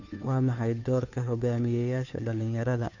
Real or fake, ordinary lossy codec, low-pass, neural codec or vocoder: fake; none; 7.2 kHz; codec, 16 kHz, 2 kbps, FunCodec, trained on Chinese and English, 25 frames a second